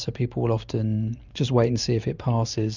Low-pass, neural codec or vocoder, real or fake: 7.2 kHz; none; real